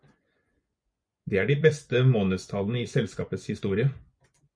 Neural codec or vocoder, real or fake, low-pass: vocoder, 24 kHz, 100 mel bands, Vocos; fake; 9.9 kHz